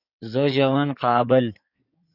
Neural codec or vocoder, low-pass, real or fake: codec, 16 kHz in and 24 kHz out, 2.2 kbps, FireRedTTS-2 codec; 5.4 kHz; fake